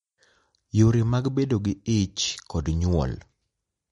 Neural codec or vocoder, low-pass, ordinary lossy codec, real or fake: none; 19.8 kHz; MP3, 48 kbps; real